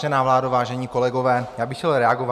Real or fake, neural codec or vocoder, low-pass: real; none; 14.4 kHz